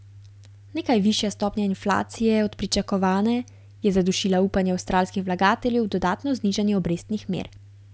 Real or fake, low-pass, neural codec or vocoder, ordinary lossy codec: real; none; none; none